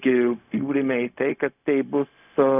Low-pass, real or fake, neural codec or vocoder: 3.6 kHz; fake; codec, 16 kHz, 0.4 kbps, LongCat-Audio-Codec